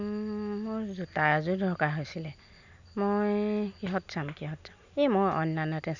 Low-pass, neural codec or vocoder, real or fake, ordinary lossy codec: 7.2 kHz; none; real; none